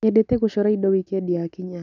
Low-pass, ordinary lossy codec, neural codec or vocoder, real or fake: 7.2 kHz; none; none; real